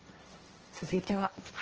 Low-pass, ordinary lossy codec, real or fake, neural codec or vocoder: 7.2 kHz; Opus, 16 kbps; fake; codec, 16 kHz, 1.1 kbps, Voila-Tokenizer